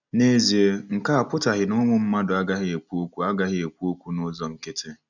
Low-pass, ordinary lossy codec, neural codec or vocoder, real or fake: 7.2 kHz; none; none; real